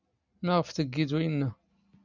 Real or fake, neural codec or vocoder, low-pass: real; none; 7.2 kHz